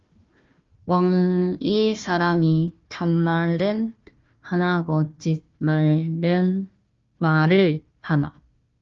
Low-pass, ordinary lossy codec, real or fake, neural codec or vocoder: 7.2 kHz; Opus, 32 kbps; fake; codec, 16 kHz, 1 kbps, FunCodec, trained on Chinese and English, 50 frames a second